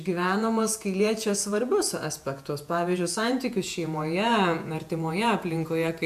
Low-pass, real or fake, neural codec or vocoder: 14.4 kHz; fake; vocoder, 48 kHz, 128 mel bands, Vocos